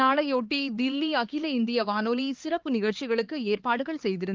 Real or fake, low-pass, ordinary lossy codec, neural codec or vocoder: fake; 7.2 kHz; Opus, 16 kbps; codec, 16 kHz, 4 kbps, X-Codec, HuBERT features, trained on LibriSpeech